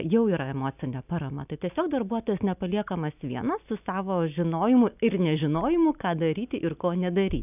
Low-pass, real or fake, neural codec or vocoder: 3.6 kHz; real; none